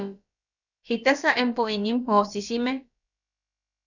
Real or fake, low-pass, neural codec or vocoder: fake; 7.2 kHz; codec, 16 kHz, about 1 kbps, DyCAST, with the encoder's durations